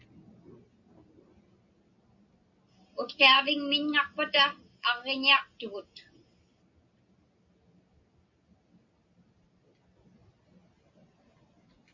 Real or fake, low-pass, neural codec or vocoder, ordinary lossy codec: real; 7.2 kHz; none; MP3, 64 kbps